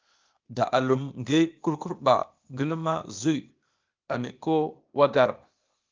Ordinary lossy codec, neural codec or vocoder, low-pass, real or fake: Opus, 24 kbps; codec, 16 kHz, 0.8 kbps, ZipCodec; 7.2 kHz; fake